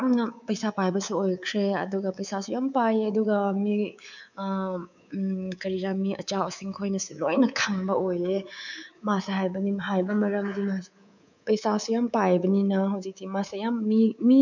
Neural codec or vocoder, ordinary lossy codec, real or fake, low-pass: codec, 24 kHz, 3.1 kbps, DualCodec; none; fake; 7.2 kHz